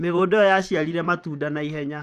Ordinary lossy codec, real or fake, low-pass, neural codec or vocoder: none; fake; 14.4 kHz; vocoder, 44.1 kHz, 128 mel bands, Pupu-Vocoder